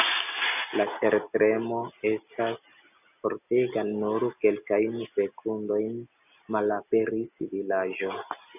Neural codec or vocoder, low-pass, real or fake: none; 3.6 kHz; real